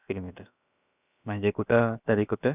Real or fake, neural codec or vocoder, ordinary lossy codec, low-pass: fake; codec, 16 kHz, about 1 kbps, DyCAST, with the encoder's durations; none; 3.6 kHz